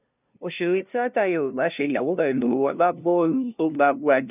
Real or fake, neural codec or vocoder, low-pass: fake; codec, 16 kHz, 0.5 kbps, FunCodec, trained on LibriTTS, 25 frames a second; 3.6 kHz